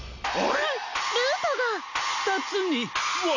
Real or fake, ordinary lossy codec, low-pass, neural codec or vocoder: real; none; 7.2 kHz; none